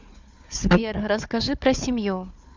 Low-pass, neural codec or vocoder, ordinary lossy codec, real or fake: 7.2 kHz; codec, 16 kHz, 4 kbps, FunCodec, trained on Chinese and English, 50 frames a second; MP3, 64 kbps; fake